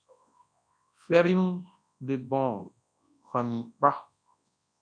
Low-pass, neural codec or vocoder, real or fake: 9.9 kHz; codec, 24 kHz, 0.9 kbps, WavTokenizer, large speech release; fake